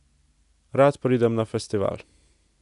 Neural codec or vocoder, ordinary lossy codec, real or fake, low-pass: none; none; real; 10.8 kHz